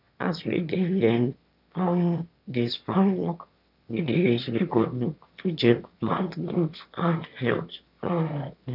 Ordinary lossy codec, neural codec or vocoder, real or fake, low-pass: none; autoencoder, 22.05 kHz, a latent of 192 numbers a frame, VITS, trained on one speaker; fake; 5.4 kHz